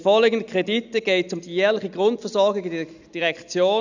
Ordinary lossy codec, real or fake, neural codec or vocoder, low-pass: none; real; none; 7.2 kHz